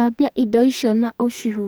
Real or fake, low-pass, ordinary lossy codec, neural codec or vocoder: fake; none; none; codec, 44.1 kHz, 2.6 kbps, SNAC